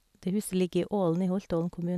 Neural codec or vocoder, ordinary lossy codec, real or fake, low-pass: none; none; real; 14.4 kHz